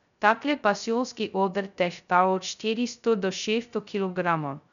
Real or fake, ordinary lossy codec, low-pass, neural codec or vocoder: fake; none; 7.2 kHz; codec, 16 kHz, 0.2 kbps, FocalCodec